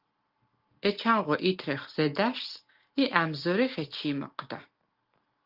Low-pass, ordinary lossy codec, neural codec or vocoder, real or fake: 5.4 kHz; Opus, 24 kbps; none; real